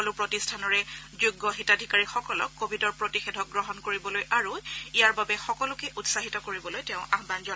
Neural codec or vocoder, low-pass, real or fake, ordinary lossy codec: none; none; real; none